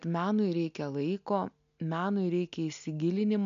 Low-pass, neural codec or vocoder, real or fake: 7.2 kHz; none; real